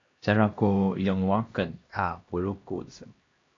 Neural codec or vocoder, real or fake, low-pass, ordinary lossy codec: codec, 16 kHz, 1 kbps, X-Codec, HuBERT features, trained on LibriSpeech; fake; 7.2 kHz; AAC, 32 kbps